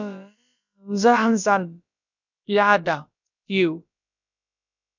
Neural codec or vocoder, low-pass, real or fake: codec, 16 kHz, about 1 kbps, DyCAST, with the encoder's durations; 7.2 kHz; fake